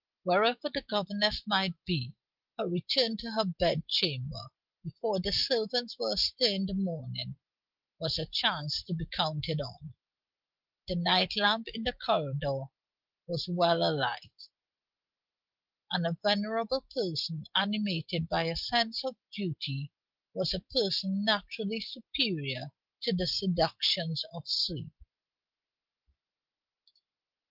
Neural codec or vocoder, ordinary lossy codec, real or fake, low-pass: none; Opus, 32 kbps; real; 5.4 kHz